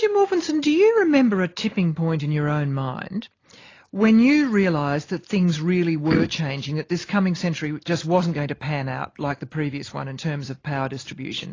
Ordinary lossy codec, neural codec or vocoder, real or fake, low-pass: AAC, 32 kbps; none; real; 7.2 kHz